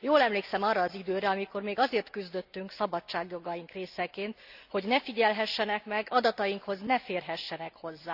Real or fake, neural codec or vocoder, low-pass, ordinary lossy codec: real; none; 5.4 kHz; Opus, 64 kbps